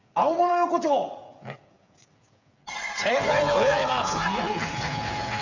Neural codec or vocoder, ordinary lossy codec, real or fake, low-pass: codec, 16 kHz, 8 kbps, FreqCodec, smaller model; none; fake; 7.2 kHz